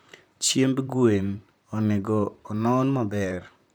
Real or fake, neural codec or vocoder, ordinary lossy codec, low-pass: fake; vocoder, 44.1 kHz, 128 mel bands, Pupu-Vocoder; none; none